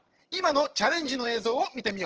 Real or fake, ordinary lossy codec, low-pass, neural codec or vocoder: fake; Opus, 16 kbps; 7.2 kHz; vocoder, 22.05 kHz, 80 mel bands, HiFi-GAN